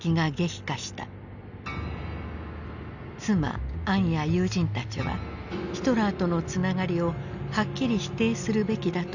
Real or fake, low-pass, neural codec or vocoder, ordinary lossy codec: real; 7.2 kHz; none; none